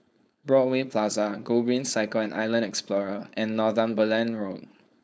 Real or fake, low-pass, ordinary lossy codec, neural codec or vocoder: fake; none; none; codec, 16 kHz, 4.8 kbps, FACodec